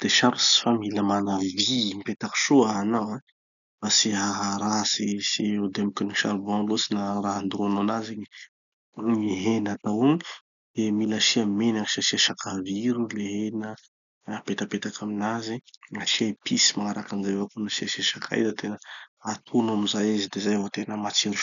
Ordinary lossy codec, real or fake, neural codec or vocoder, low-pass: none; real; none; 7.2 kHz